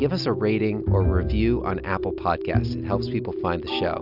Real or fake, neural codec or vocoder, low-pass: real; none; 5.4 kHz